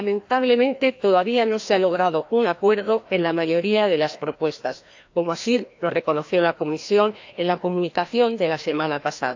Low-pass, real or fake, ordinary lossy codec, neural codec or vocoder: 7.2 kHz; fake; AAC, 48 kbps; codec, 16 kHz, 1 kbps, FreqCodec, larger model